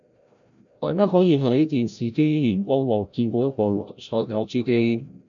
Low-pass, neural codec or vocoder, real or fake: 7.2 kHz; codec, 16 kHz, 0.5 kbps, FreqCodec, larger model; fake